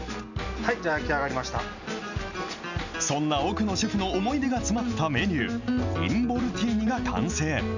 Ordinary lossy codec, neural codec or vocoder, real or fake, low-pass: none; none; real; 7.2 kHz